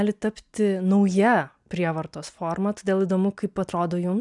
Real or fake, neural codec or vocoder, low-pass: real; none; 10.8 kHz